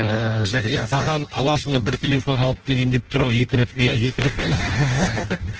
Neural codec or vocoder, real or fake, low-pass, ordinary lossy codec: codec, 16 kHz in and 24 kHz out, 0.6 kbps, FireRedTTS-2 codec; fake; 7.2 kHz; Opus, 16 kbps